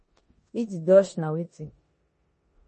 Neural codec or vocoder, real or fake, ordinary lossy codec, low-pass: codec, 16 kHz in and 24 kHz out, 0.9 kbps, LongCat-Audio-Codec, four codebook decoder; fake; MP3, 32 kbps; 10.8 kHz